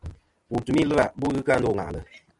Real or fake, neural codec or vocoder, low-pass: real; none; 10.8 kHz